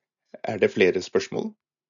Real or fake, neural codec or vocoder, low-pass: real; none; 7.2 kHz